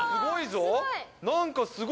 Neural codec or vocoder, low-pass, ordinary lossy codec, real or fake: none; none; none; real